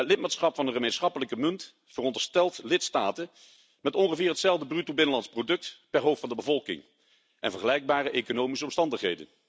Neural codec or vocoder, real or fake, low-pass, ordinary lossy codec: none; real; none; none